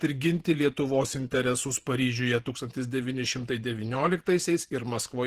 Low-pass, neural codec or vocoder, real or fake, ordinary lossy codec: 14.4 kHz; vocoder, 48 kHz, 128 mel bands, Vocos; fake; Opus, 16 kbps